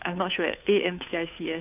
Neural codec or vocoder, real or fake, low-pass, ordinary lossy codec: codec, 16 kHz, 2 kbps, FunCodec, trained on Chinese and English, 25 frames a second; fake; 3.6 kHz; none